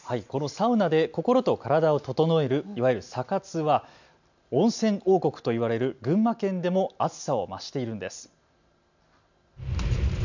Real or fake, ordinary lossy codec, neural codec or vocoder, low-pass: real; none; none; 7.2 kHz